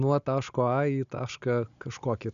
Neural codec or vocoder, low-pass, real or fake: none; 7.2 kHz; real